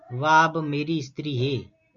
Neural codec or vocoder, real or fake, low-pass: none; real; 7.2 kHz